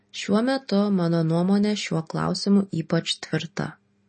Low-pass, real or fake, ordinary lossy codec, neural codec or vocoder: 9.9 kHz; real; MP3, 32 kbps; none